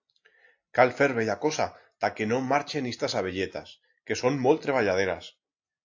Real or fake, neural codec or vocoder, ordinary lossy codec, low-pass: real; none; AAC, 48 kbps; 7.2 kHz